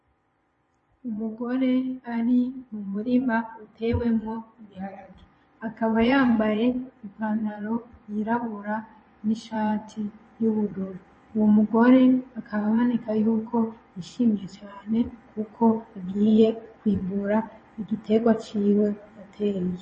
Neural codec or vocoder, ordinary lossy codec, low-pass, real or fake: vocoder, 22.05 kHz, 80 mel bands, Vocos; MP3, 32 kbps; 9.9 kHz; fake